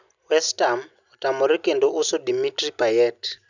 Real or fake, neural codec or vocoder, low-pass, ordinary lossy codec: real; none; 7.2 kHz; none